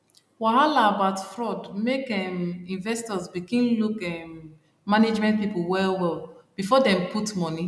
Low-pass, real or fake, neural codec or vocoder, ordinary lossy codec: none; real; none; none